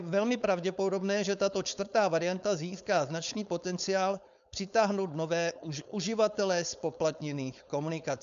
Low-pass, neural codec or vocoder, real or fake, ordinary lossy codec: 7.2 kHz; codec, 16 kHz, 4.8 kbps, FACodec; fake; MP3, 96 kbps